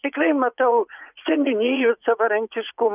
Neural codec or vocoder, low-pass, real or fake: vocoder, 44.1 kHz, 80 mel bands, Vocos; 3.6 kHz; fake